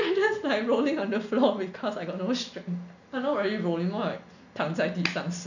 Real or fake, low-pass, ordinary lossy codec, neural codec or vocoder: real; 7.2 kHz; none; none